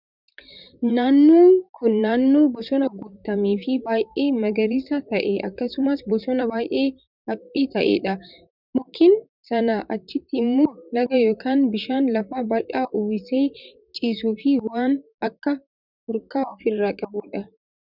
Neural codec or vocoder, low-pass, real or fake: vocoder, 44.1 kHz, 128 mel bands, Pupu-Vocoder; 5.4 kHz; fake